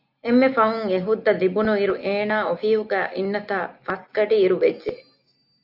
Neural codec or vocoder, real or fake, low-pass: none; real; 5.4 kHz